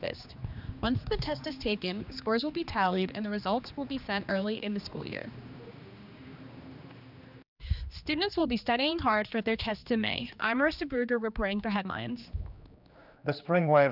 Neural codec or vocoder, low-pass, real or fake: codec, 16 kHz, 2 kbps, X-Codec, HuBERT features, trained on general audio; 5.4 kHz; fake